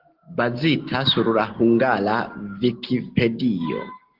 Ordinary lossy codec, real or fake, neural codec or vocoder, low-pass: Opus, 16 kbps; real; none; 5.4 kHz